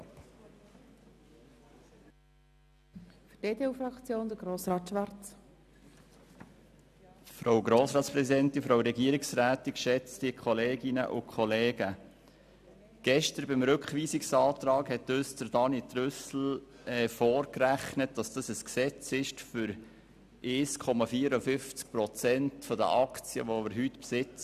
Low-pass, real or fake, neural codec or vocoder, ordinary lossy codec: 14.4 kHz; real; none; none